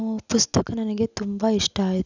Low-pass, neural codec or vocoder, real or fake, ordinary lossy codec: 7.2 kHz; none; real; none